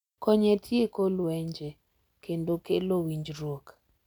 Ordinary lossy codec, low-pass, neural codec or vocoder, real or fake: none; 19.8 kHz; none; real